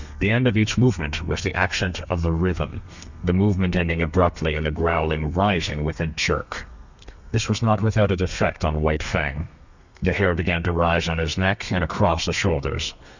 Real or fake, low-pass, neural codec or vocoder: fake; 7.2 kHz; codec, 32 kHz, 1.9 kbps, SNAC